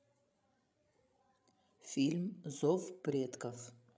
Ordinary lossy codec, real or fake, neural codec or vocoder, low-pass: none; fake; codec, 16 kHz, 16 kbps, FreqCodec, larger model; none